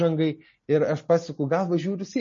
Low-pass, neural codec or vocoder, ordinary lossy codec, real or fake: 7.2 kHz; none; MP3, 32 kbps; real